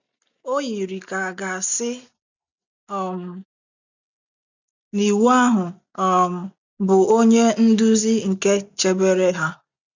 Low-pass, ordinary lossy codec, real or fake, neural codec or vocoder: 7.2 kHz; none; real; none